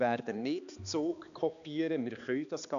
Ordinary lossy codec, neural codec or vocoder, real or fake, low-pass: none; codec, 16 kHz, 2 kbps, X-Codec, HuBERT features, trained on balanced general audio; fake; 7.2 kHz